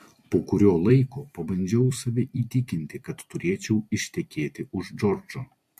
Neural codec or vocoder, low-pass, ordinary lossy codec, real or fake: vocoder, 44.1 kHz, 128 mel bands every 256 samples, BigVGAN v2; 14.4 kHz; MP3, 64 kbps; fake